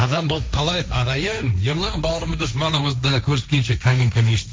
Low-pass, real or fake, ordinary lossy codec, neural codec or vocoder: none; fake; none; codec, 16 kHz, 1.1 kbps, Voila-Tokenizer